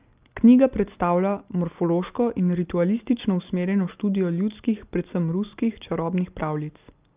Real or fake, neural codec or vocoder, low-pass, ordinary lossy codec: real; none; 3.6 kHz; Opus, 24 kbps